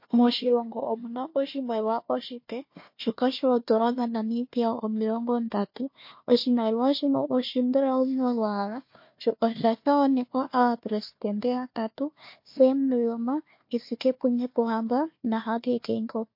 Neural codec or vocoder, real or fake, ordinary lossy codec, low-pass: codec, 16 kHz, 1 kbps, FunCodec, trained on Chinese and English, 50 frames a second; fake; MP3, 32 kbps; 5.4 kHz